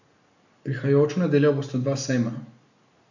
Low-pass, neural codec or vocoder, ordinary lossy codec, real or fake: 7.2 kHz; none; none; real